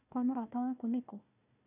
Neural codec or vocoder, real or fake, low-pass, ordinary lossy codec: codec, 16 kHz, 1 kbps, FunCodec, trained on Chinese and English, 50 frames a second; fake; 3.6 kHz; none